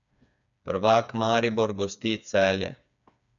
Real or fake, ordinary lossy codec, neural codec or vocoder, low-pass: fake; none; codec, 16 kHz, 4 kbps, FreqCodec, smaller model; 7.2 kHz